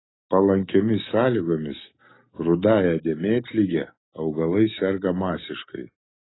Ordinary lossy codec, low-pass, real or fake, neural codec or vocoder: AAC, 16 kbps; 7.2 kHz; real; none